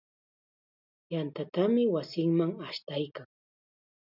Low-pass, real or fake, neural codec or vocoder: 5.4 kHz; real; none